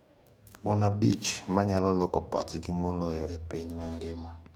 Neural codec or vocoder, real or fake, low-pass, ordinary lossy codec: codec, 44.1 kHz, 2.6 kbps, DAC; fake; 19.8 kHz; none